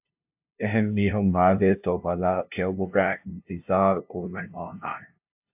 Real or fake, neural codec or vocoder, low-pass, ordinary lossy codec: fake; codec, 16 kHz, 0.5 kbps, FunCodec, trained on LibriTTS, 25 frames a second; 3.6 kHz; AAC, 32 kbps